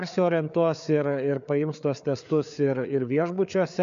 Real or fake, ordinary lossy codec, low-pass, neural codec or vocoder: fake; AAC, 96 kbps; 7.2 kHz; codec, 16 kHz, 4 kbps, FreqCodec, larger model